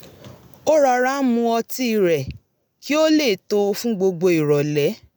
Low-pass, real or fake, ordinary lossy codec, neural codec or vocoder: none; real; none; none